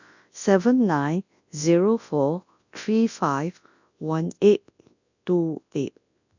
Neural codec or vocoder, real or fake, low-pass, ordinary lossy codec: codec, 24 kHz, 0.9 kbps, WavTokenizer, large speech release; fake; 7.2 kHz; none